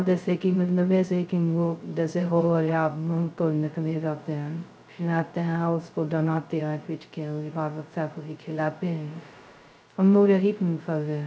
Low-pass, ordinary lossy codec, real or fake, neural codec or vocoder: none; none; fake; codec, 16 kHz, 0.2 kbps, FocalCodec